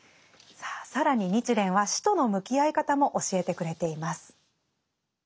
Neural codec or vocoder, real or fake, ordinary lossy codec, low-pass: none; real; none; none